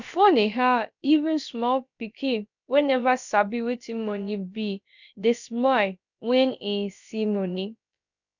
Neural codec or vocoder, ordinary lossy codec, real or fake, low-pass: codec, 16 kHz, about 1 kbps, DyCAST, with the encoder's durations; none; fake; 7.2 kHz